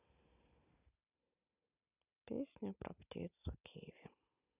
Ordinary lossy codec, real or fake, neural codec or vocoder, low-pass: none; real; none; 3.6 kHz